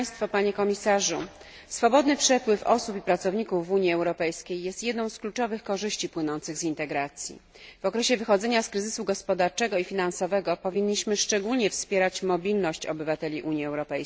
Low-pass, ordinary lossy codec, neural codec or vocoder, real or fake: none; none; none; real